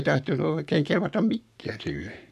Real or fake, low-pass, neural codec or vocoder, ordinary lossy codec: fake; 14.4 kHz; codec, 44.1 kHz, 7.8 kbps, Pupu-Codec; none